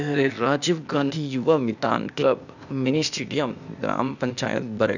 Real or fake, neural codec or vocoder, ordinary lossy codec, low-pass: fake; codec, 16 kHz, 0.8 kbps, ZipCodec; none; 7.2 kHz